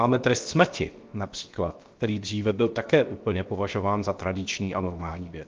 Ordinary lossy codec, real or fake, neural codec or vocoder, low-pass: Opus, 32 kbps; fake; codec, 16 kHz, 0.7 kbps, FocalCodec; 7.2 kHz